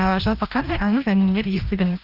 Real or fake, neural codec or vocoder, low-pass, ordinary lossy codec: fake; codec, 16 kHz, 1 kbps, FreqCodec, larger model; 5.4 kHz; Opus, 24 kbps